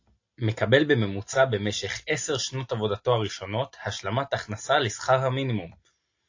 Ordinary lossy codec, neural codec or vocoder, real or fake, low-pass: AAC, 48 kbps; none; real; 7.2 kHz